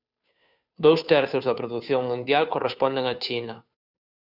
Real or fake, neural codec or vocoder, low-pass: fake; codec, 16 kHz, 2 kbps, FunCodec, trained on Chinese and English, 25 frames a second; 5.4 kHz